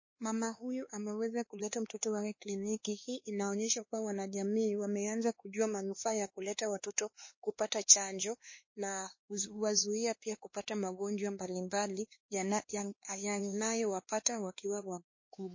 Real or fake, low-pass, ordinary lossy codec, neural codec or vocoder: fake; 7.2 kHz; MP3, 32 kbps; codec, 16 kHz, 2 kbps, X-Codec, WavLM features, trained on Multilingual LibriSpeech